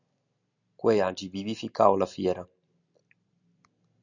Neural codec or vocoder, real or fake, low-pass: none; real; 7.2 kHz